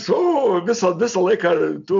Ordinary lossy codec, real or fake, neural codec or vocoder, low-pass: MP3, 64 kbps; real; none; 7.2 kHz